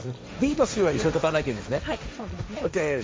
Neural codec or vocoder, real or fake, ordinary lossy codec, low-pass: codec, 16 kHz, 1.1 kbps, Voila-Tokenizer; fake; none; none